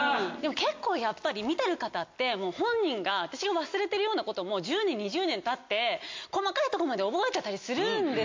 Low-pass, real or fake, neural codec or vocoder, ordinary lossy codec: 7.2 kHz; real; none; MP3, 48 kbps